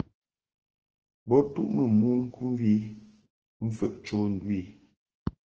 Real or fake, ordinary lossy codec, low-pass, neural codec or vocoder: fake; Opus, 16 kbps; 7.2 kHz; autoencoder, 48 kHz, 32 numbers a frame, DAC-VAE, trained on Japanese speech